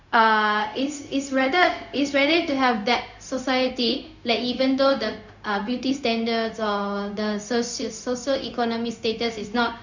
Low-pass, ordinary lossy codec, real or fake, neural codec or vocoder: 7.2 kHz; none; fake; codec, 16 kHz, 0.4 kbps, LongCat-Audio-Codec